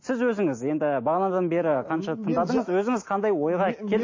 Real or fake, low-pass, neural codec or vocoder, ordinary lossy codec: real; 7.2 kHz; none; MP3, 32 kbps